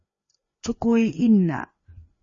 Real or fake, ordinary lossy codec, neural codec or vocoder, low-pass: fake; MP3, 32 kbps; codec, 16 kHz, 2 kbps, FreqCodec, larger model; 7.2 kHz